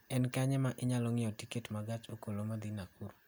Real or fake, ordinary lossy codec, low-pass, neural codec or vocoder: real; none; none; none